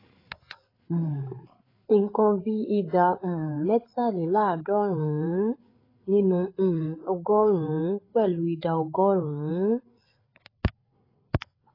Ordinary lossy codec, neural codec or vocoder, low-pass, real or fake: AAC, 24 kbps; codec, 16 kHz, 8 kbps, FreqCodec, larger model; 5.4 kHz; fake